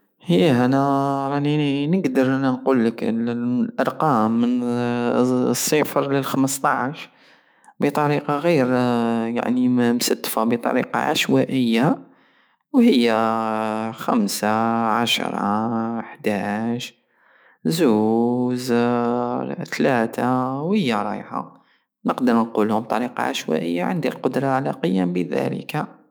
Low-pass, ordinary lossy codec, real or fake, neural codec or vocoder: none; none; fake; autoencoder, 48 kHz, 128 numbers a frame, DAC-VAE, trained on Japanese speech